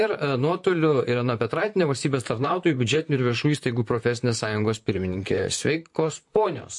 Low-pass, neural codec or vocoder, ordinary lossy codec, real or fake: 10.8 kHz; vocoder, 44.1 kHz, 128 mel bands, Pupu-Vocoder; MP3, 48 kbps; fake